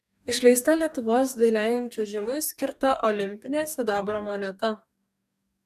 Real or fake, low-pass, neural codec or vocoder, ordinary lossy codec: fake; 14.4 kHz; codec, 44.1 kHz, 2.6 kbps, DAC; MP3, 96 kbps